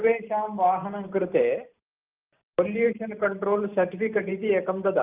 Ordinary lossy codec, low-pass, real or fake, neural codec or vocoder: Opus, 24 kbps; 3.6 kHz; real; none